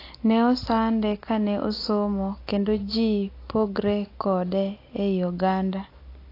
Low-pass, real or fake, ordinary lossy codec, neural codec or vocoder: 5.4 kHz; real; AAC, 32 kbps; none